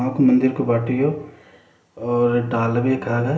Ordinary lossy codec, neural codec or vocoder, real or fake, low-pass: none; none; real; none